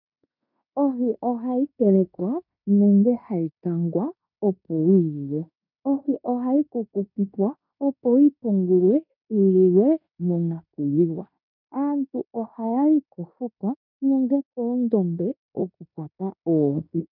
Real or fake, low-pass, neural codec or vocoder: fake; 5.4 kHz; codec, 16 kHz in and 24 kHz out, 0.9 kbps, LongCat-Audio-Codec, fine tuned four codebook decoder